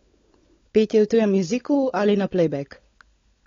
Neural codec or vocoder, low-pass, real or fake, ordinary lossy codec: codec, 16 kHz, 16 kbps, FunCodec, trained on LibriTTS, 50 frames a second; 7.2 kHz; fake; AAC, 32 kbps